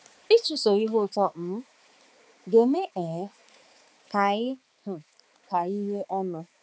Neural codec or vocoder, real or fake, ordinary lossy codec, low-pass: codec, 16 kHz, 4 kbps, X-Codec, HuBERT features, trained on balanced general audio; fake; none; none